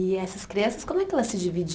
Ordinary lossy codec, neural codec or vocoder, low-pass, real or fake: none; none; none; real